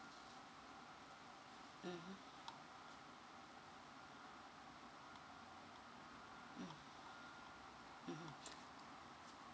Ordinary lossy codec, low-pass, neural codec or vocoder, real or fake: none; none; none; real